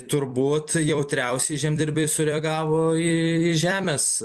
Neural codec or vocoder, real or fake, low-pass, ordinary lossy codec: vocoder, 44.1 kHz, 128 mel bands every 256 samples, BigVGAN v2; fake; 14.4 kHz; Opus, 64 kbps